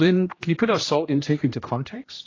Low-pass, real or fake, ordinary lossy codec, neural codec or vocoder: 7.2 kHz; fake; AAC, 32 kbps; codec, 16 kHz, 1 kbps, X-Codec, HuBERT features, trained on general audio